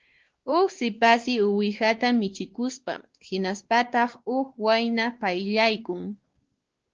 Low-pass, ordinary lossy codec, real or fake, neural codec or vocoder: 7.2 kHz; Opus, 16 kbps; fake; codec, 16 kHz, 2 kbps, X-Codec, WavLM features, trained on Multilingual LibriSpeech